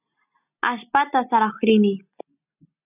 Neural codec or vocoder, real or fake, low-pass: none; real; 3.6 kHz